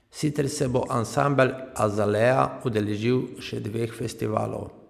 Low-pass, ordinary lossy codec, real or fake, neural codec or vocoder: 14.4 kHz; MP3, 96 kbps; real; none